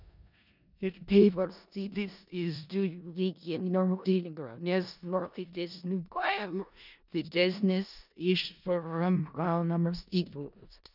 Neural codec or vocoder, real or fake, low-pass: codec, 16 kHz in and 24 kHz out, 0.4 kbps, LongCat-Audio-Codec, four codebook decoder; fake; 5.4 kHz